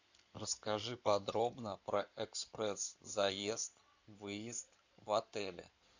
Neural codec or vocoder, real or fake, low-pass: codec, 16 kHz in and 24 kHz out, 2.2 kbps, FireRedTTS-2 codec; fake; 7.2 kHz